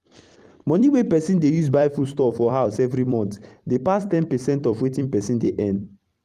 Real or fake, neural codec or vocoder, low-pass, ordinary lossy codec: fake; vocoder, 44.1 kHz, 128 mel bands every 256 samples, BigVGAN v2; 14.4 kHz; Opus, 32 kbps